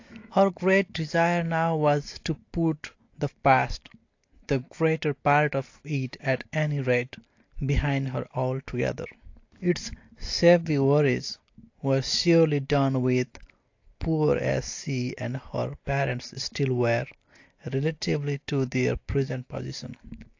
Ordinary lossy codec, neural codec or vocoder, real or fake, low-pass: AAC, 48 kbps; none; real; 7.2 kHz